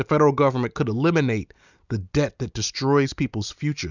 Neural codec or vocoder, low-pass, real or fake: none; 7.2 kHz; real